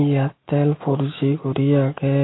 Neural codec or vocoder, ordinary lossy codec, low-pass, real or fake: none; AAC, 16 kbps; 7.2 kHz; real